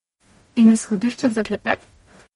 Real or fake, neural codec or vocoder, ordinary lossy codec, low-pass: fake; codec, 44.1 kHz, 0.9 kbps, DAC; MP3, 48 kbps; 19.8 kHz